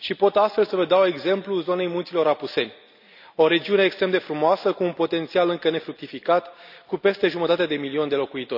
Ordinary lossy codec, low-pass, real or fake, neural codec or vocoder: none; 5.4 kHz; real; none